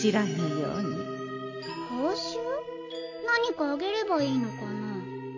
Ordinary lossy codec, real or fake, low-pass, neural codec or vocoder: AAC, 48 kbps; real; 7.2 kHz; none